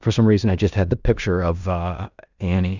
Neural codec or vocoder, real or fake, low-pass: codec, 16 kHz in and 24 kHz out, 0.9 kbps, LongCat-Audio-Codec, fine tuned four codebook decoder; fake; 7.2 kHz